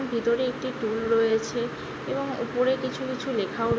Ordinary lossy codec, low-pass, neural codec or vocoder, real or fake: none; none; none; real